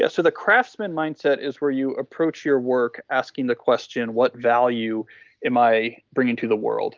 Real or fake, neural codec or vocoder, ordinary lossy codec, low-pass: real; none; Opus, 32 kbps; 7.2 kHz